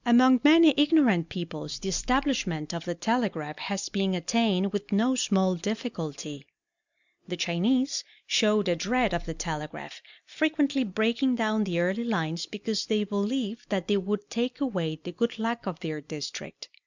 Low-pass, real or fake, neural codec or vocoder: 7.2 kHz; real; none